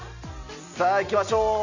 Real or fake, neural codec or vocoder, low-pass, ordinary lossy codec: real; none; 7.2 kHz; none